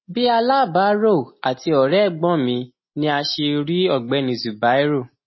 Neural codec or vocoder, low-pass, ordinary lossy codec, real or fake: none; 7.2 kHz; MP3, 24 kbps; real